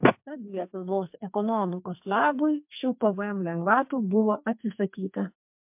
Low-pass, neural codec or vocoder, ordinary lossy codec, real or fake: 3.6 kHz; codec, 44.1 kHz, 2.6 kbps, SNAC; MP3, 32 kbps; fake